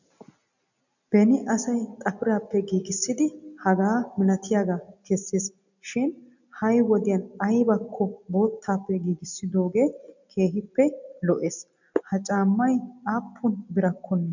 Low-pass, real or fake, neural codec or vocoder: 7.2 kHz; real; none